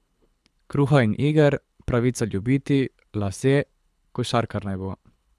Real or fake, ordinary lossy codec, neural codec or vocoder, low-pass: fake; none; codec, 24 kHz, 6 kbps, HILCodec; none